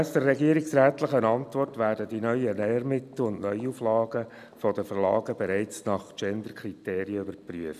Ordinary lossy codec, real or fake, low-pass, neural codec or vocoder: none; real; 14.4 kHz; none